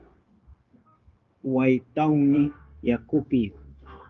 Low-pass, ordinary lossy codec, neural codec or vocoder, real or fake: 7.2 kHz; Opus, 32 kbps; codec, 16 kHz, 0.9 kbps, LongCat-Audio-Codec; fake